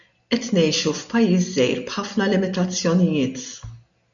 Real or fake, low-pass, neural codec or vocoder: real; 7.2 kHz; none